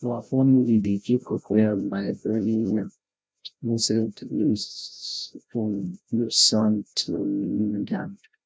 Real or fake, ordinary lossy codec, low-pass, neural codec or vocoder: fake; none; none; codec, 16 kHz, 0.5 kbps, FreqCodec, larger model